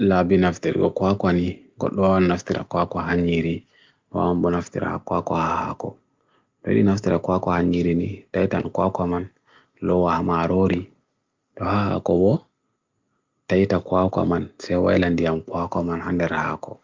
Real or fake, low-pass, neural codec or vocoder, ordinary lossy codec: real; 7.2 kHz; none; Opus, 24 kbps